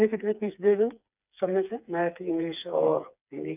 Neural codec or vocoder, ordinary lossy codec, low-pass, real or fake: codec, 16 kHz, 4 kbps, FreqCodec, smaller model; none; 3.6 kHz; fake